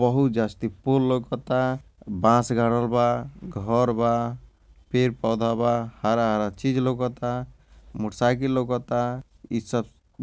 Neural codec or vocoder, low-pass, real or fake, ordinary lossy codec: none; none; real; none